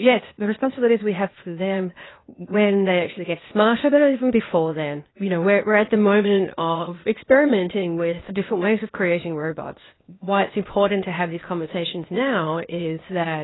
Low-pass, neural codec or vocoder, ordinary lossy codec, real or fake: 7.2 kHz; codec, 16 kHz, 0.8 kbps, ZipCodec; AAC, 16 kbps; fake